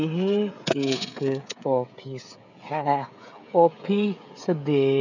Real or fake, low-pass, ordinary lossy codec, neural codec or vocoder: fake; 7.2 kHz; none; codec, 16 kHz, 16 kbps, FreqCodec, smaller model